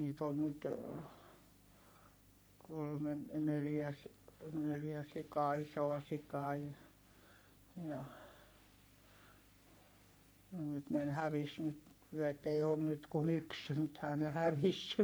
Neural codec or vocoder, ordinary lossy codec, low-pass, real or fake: codec, 44.1 kHz, 3.4 kbps, Pupu-Codec; none; none; fake